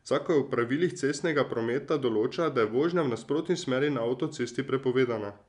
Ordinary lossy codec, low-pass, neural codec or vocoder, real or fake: none; 10.8 kHz; none; real